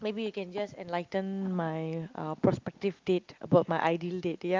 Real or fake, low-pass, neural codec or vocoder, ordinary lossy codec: real; 7.2 kHz; none; Opus, 24 kbps